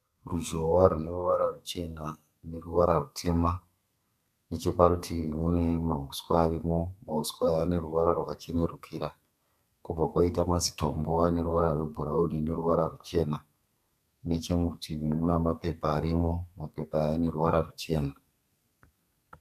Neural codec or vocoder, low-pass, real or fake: codec, 32 kHz, 1.9 kbps, SNAC; 14.4 kHz; fake